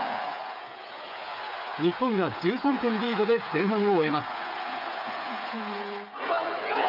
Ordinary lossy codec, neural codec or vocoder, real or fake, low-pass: none; codec, 16 kHz, 8 kbps, FreqCodec, smaller model; fake; 5.4 kHz